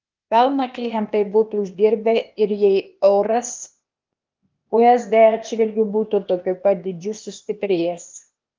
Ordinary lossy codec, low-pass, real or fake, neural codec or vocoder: Opus, 24 kbps; 7.2 kHz; fake; codec, 16 kHz, 0.8 kbps, ZipCodec